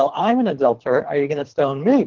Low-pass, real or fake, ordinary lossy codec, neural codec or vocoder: 7.2 kHz; fake; Opus, 16 kbps; codec, 24 kHz, 3 kbps, HILCodec